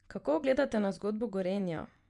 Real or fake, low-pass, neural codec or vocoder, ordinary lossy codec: fake; 10.8 kHz; vocoder, 24 kHz, 100 mel bands, Vocos; none